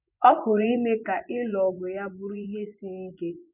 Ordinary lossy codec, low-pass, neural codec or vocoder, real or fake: none; 3.6 kHz; vocoder, 24 kHz, 100 mel bands, Vocos; fake